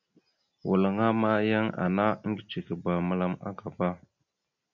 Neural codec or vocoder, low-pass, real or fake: none; 7.2 kHz; real